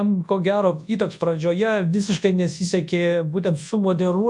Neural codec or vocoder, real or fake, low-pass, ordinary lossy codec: codec, 24 kHz, 0.9 kbps, WavTokenizer, large speech release; fake; 10.8 kHz; MP3, 64 kbps